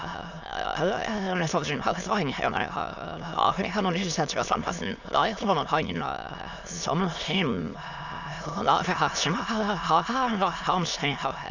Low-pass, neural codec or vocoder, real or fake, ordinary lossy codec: 7.2 kHz; autoencoder, 22.05 kHz, a latent of 192 numbers a frame, VITS, trained on many speakers; fake; none